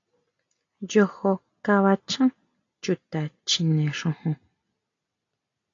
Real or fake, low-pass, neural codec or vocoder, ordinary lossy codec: real; 7.2 kHz; none; AAC, 48 kbps